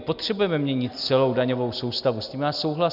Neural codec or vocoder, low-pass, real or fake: none; 5.4 kHz; real